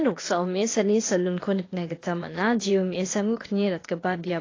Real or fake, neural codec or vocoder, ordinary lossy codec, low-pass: fake; codec, 16 kHz, 0.8 kbps, ZipCodec; AAC, 32 kbps; 7.2 kHz